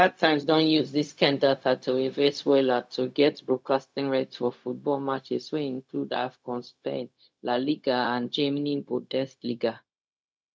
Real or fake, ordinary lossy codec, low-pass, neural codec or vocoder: fake; none; none; codec, 16 kHz, 0.4 kbps, LongCat-Audio-Codec